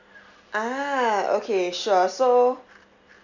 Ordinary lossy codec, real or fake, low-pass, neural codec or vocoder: none; real; 7.2 kHz; none